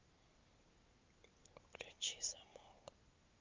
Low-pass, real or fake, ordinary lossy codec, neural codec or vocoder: 7.2 kHz; real; Opus, 32 kbps; none